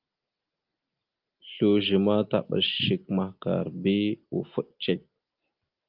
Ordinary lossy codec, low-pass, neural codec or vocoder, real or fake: Opus, 32 kbps; 5.4 kHz; none; real